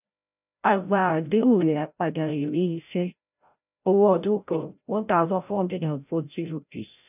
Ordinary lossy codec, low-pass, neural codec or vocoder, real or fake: none; 3.6 kHz; codec, 16 kHz, 0.5 kbps, FreqCodec, larger model; fake